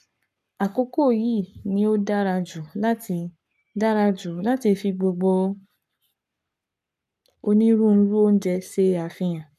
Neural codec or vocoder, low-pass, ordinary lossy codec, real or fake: codec, 44.1 kHz, 7.8 kbps, Pupu-Codec; 14.4 kHz; none; fake